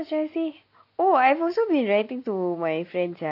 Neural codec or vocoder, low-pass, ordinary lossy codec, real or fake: none; 5.4 kHz; none; real